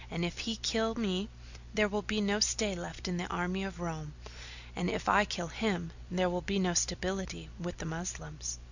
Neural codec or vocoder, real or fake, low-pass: none; real; 7.2 kHz